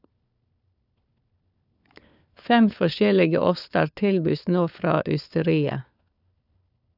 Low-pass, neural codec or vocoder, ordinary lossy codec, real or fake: 5.4 kHz; codec, 16 kHz, 4 kbps, FunCodec, trained on LibriTTS, 50 frames a second; none; fake